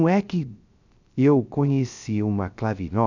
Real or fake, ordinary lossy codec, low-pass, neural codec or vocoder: fake; none; 7.2 kHz; codec, 16 kHz, 0.3 kbps, FocalCodec